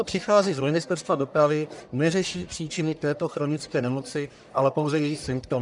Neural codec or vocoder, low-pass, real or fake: codec, 44.1 kHz, 1.7 kbps, Pupu-Codec; 10.8 kHz; fake